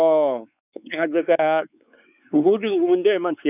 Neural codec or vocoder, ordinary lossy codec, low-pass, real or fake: codec, 16 kHz, 4 kbps, X-Codec, WavLM features, trained on Multilingual LibriSpeech; none; 3.6 kHz; fake